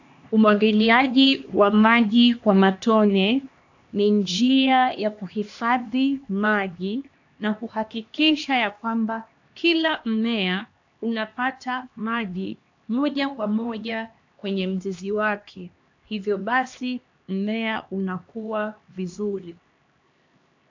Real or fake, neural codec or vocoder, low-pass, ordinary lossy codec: fake; codec, 16 kHz, 2 kbps, X-Codec, HuBERT features, trained on LibriSpeech; 7.2 kHz; AAC, 48 kbps